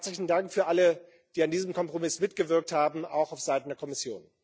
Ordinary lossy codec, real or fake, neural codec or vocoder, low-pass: none; real; none; none